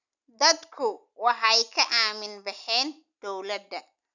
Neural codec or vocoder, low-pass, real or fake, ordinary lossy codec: none; 7.2 kHz; real; none